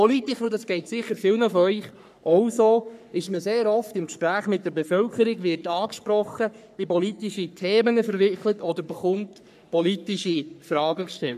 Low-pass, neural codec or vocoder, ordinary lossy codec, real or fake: 14.4 kHz; codec, 44.1 kHz, 3.4 kbps, Pupu-Codec; none; fake